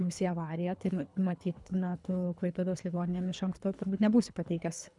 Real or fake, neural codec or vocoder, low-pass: fake; codec, 24 kHz, 3 kbps, HILCodec; 10.8 kHz